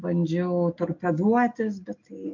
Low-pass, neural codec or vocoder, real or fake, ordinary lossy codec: 7.2 kHz; none; real; MP3, 48 kbps